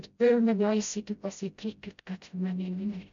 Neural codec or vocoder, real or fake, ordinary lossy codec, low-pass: codec, 16 kHz, 0.5 kbps, FreqCodec, smaller model; fake; MP3, 64 kbps; 7.2 kHz